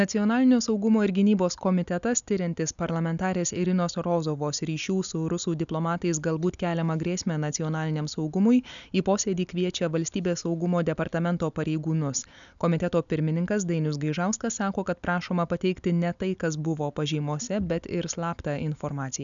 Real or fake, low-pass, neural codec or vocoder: real; 7.2 kHz; none